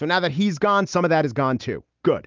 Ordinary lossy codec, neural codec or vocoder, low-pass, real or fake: Opus, 32 kbps; none; 7.2 kHz; real